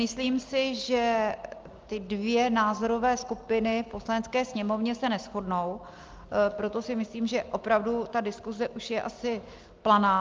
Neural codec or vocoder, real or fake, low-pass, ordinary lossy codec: none; real; 7.2 kHz; Opus, 24 kbps